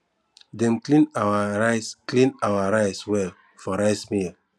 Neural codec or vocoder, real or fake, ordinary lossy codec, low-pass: none; real; none; none